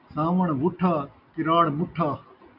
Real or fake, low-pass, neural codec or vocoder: real; 5.4 kHz; none